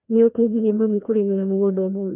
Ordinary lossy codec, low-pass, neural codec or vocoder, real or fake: none; 3.6 kHz; codec, 16 kHz, 1 kbps, FreqCodec, larger model; fake